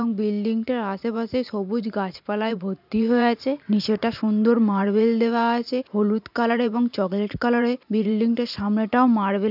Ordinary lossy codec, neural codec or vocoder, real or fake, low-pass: none; vocoder, 44.1 kHz, 128 mel bands every 512 samples, BigVGAN v2; fake; 5.4 kHz